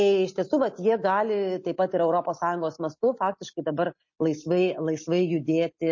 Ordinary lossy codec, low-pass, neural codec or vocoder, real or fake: MP3, 32 kbps; 7.2 kHz; none; real